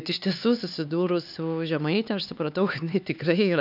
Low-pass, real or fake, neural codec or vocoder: 5.4 kHz; fake; codec, 16 kHz, 4 kbps, X-Codec, HuBERT features, trained on LibriSpeech